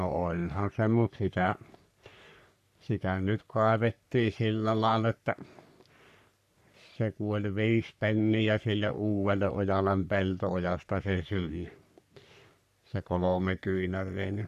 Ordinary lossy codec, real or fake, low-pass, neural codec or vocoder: none; fake; 14.4 kHz; codec, 44.1 kHz, 3.4 kbps, Pupu-Codec